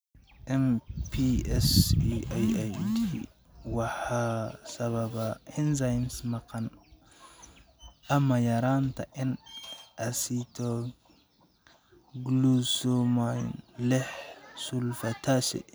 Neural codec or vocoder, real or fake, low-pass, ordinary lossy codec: none; real; none; none